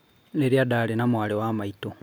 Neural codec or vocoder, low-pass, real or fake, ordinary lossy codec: none; none; real; none